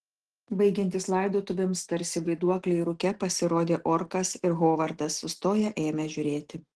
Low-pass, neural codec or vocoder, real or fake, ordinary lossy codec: 10.8 kHz; none; real; Opus, 16 kbps